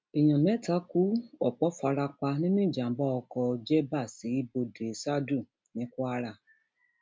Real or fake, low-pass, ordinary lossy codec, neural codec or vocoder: real; none; none; none